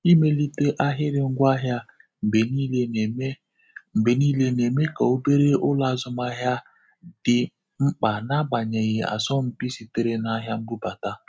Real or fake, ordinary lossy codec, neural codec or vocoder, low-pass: real; none; none; none